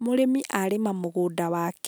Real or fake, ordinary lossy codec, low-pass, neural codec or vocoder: real; none; none; none